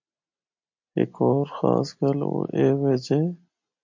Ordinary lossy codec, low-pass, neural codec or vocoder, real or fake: MP3, 48 kbps; 7.2 kHz; none; real